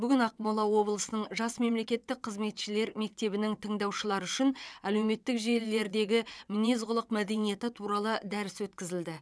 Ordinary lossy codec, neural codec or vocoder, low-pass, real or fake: none; vocoder, 22.05 kHz, 80 mel bands, WaveNeXt; none; fake